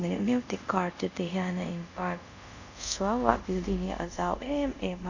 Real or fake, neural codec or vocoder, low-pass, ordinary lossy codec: fake; codec, 24 kHz, 0.5 kbps, DualCodec; 7.2 kHz; none